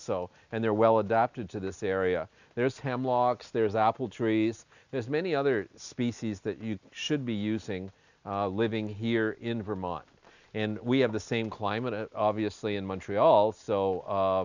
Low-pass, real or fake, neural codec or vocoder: 7.2 kHz; real; none